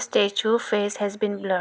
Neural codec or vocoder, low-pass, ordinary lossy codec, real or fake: none; none; none; real